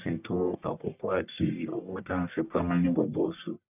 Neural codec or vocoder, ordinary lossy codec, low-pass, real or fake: codec, 44.1 kHz, 1.7 kbps, Pupu-Codec; none; 3.6 kHz; fake